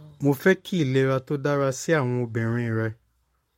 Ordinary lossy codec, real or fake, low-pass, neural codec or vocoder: MP3, 64 kbps; fake; 19.8 kHz; codec, 44.1 kHz, 7.8 kbps, Pupu-Codec